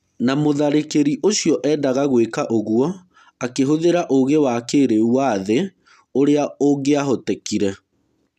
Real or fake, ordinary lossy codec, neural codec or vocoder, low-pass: real; none; none; 14.4 kHz